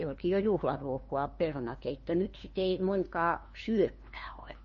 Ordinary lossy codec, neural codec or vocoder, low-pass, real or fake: MP3, 32 kbps; codec, 16 kHz, 2 kbps, FunCodec, trained on LibriTTS, 25 frames a second; 7.2 kHz; fake